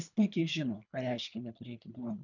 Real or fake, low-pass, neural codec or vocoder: fake; 7.2 kHz; codec, 24 kHz, 3 kbps, HILCodec